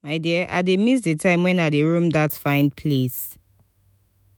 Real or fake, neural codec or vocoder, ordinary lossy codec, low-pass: fake; autoencoder, 48 kHz, 128 numbers a frame, DAC-VAE, trained on Japanese speech; none; none